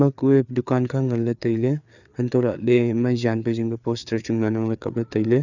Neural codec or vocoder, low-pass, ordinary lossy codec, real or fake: codec, 16 kHz, 4 kbps, FreqCodec, larger model; 7.2 kHz; none; fake